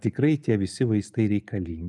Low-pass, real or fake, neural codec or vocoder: 10.8 kHz; real; none